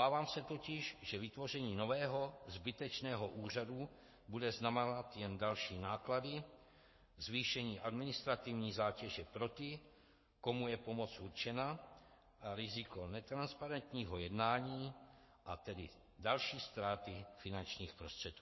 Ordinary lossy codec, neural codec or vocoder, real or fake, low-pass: MP3, 24 kbps; codec, 44.1 kHz, 7.8 kbps, Pupu-Codec; fake; 7.2 kHz